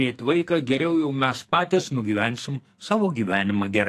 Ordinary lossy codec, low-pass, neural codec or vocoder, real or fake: AAC, 64 kbps; 14.4 kHz; codec, 44.1 kHz, 2.6 kbps, SNAC; fake